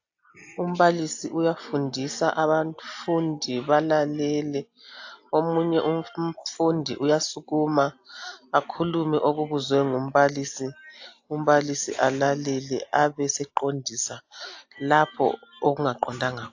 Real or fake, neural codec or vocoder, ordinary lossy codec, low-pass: real; none; AAC, 48 kbps; 7.2 kHz